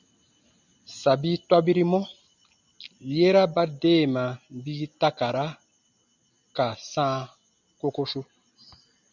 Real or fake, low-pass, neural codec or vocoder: real; 7.2 kHz; none